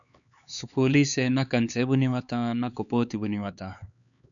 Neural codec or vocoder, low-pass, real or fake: codec, 16 kHz, 4 kbps, X-Codec, HuBERT features, trained on LibriSpeech; 7.2 kHz; fake